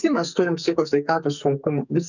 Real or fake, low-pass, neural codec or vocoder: fake; 7.2 kHz; codec, 44.1 kHz, 2.6 kbps, SNAC